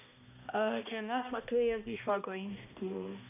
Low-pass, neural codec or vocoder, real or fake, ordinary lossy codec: 3.6 kHz; codec, 16 kHz, 1 kbps, X-Codec, HuBERT features, trained on balanced general audio; fake; none